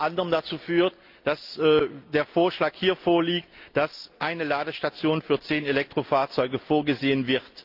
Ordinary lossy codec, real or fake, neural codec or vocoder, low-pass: Opus, 24 kbps; real; none; 5.4 kHz